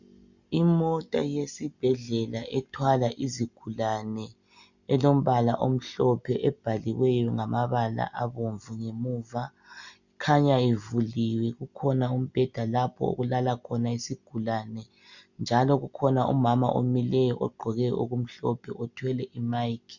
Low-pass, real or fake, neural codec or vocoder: 7.2 kHz; real; none